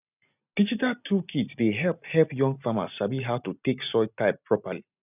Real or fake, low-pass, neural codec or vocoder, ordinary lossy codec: real; 3.6 kHz; none; none